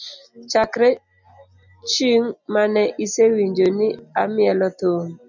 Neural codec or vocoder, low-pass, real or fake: none; 7.2 kHz; real